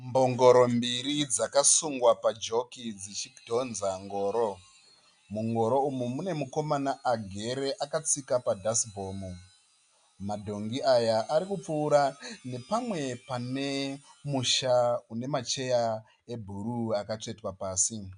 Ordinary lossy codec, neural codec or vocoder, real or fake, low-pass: MP3, 96 kbps; none; real; 9.9 kHz